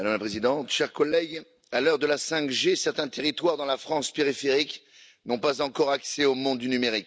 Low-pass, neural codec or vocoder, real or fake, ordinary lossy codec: none; none; real; none